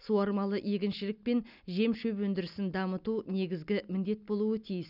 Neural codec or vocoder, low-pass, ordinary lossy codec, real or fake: none; 5.4 kHz; none; real